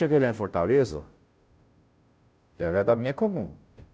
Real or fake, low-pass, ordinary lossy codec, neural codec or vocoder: fake; none; none; codec, 16 kHz, 0.5 kbps, FunCodec, trained on Chinese and English, 25 frames a second